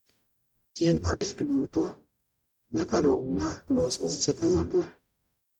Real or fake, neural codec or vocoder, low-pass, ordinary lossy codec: fake; codec, 44.1 kHz, 0.9 kbps, DAC; 19.8 kHz; none